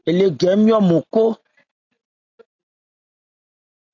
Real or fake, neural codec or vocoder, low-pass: real; none; 7.2 kHz